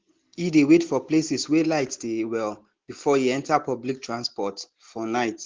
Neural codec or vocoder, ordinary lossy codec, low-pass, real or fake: none; Opus, 16 kbps; 7.2 kHz; real